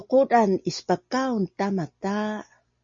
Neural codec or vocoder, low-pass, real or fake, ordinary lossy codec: none; 7.2 kHz; real; MP3, 32 kbps